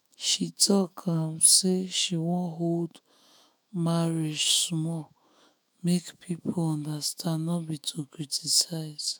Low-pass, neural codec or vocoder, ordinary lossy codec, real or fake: none; autoencoder, 48 kHz, 128 numbers a frame, DAC-VAE, trained on Japanese speech; none; fake